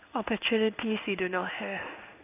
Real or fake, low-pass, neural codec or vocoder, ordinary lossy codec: fake; 3.6 kHz; codec, 16 kHz in and 24 kHz out, 1 kbps, XY-Tokenizer; none